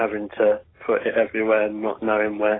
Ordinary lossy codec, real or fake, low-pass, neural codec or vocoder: AAC, 16 kbps; real; 7.2 kHz; none